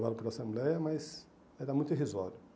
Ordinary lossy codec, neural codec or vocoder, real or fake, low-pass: none; none; real; none